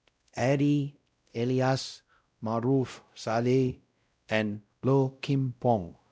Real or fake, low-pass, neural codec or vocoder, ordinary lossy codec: fake; none; codec, 16 kHz, 0.5 kbps, X-Codec, WavLM features, trained on Multilingual LibriSpeech; none